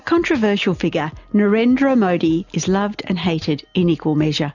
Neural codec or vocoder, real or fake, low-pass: none; real; 7.2 kHz